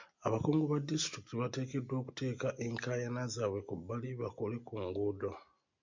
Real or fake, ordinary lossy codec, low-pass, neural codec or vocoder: real; MP3, 64 kbps; 7.2 kHz; none